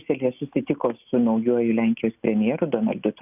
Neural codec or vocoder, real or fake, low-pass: none; real; 3.6 kHz